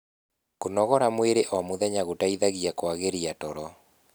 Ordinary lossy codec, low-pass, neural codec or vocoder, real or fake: none; none; none; real